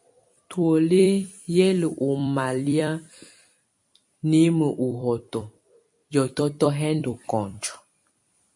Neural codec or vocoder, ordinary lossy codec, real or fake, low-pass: vocoder, 44.1 kHz, 128 mel bands every 256 samples, BigVGAN v2; MP3, 48 kbps; fake; 10.8 kHz